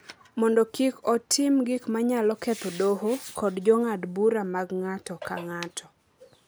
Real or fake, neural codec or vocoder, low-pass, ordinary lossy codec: real; none; none; none